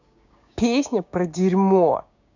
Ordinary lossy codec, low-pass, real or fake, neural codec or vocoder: none; 7.2 kHz; fake; codec, 16 kHz, 6 kbps, DAC